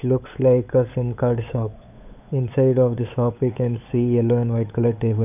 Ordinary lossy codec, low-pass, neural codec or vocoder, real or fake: none; 3.6 kHz; codec, 16 kHz, 8 kbps, FunCodec, trained on LibriTTS, 25 frames a second; fake